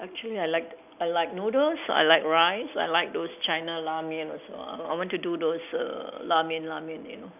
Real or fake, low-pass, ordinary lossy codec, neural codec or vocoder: real; 3.6 kHz; none; none